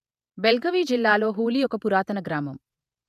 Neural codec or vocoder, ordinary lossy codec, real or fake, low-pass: vocoder, 48 kHz, 128 mel bands, Vocos; none; fake; 14.4 kHz